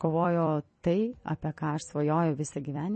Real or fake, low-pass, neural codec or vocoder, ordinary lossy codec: fake; 10.8 kHz; vocoder, 44.1 kHz, 128 mel bands every 256 samples, BigVGAN v2; MP3, 32 kbps